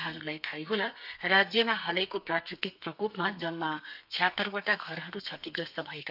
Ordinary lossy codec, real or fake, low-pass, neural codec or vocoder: none; fake; 5.4 kHz; codec, 32 kHz, 1.9 kbps, SNAC